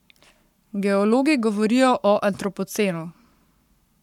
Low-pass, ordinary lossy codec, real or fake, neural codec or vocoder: 19.8 kHz; none; fake; codec, 44.1 kHz, 7.8 kbps, Pupu-Codec